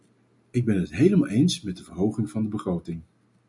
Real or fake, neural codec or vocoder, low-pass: real; none; 10.8 kHz